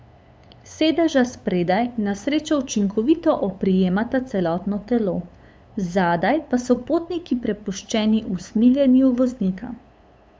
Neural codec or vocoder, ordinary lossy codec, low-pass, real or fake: codec, 16 kHz, 8 kbps, FunCodec, trained on LibriTTS, 25 frames a second; none; none; fake